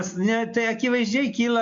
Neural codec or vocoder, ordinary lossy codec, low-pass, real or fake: none; AAC, 48 kbps; 7.2 kHz; real